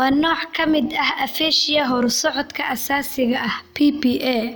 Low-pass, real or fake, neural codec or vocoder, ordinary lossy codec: none; real; none; none